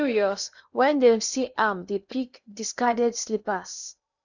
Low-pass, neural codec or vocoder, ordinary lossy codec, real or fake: 7.2 kHz; codec, 16 kHz in and 24 kHz out, 0.8 kbps, FocalCodec, streaming, 65536 codes; none; fake